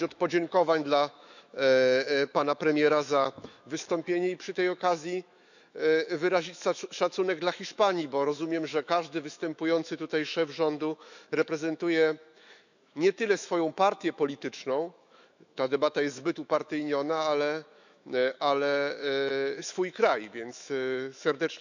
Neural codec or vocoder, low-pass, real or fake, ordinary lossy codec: autoencoder, 48 kHz, 128 numbers a frame, DAC-VAE, trained on Japanese speech; 7.2 kHz; fake; none